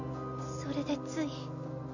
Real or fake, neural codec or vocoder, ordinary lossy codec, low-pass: real; none; none; 7.2 kHz